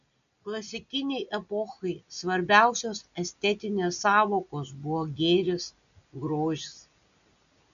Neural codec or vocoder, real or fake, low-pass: none; real; 7.2 kHz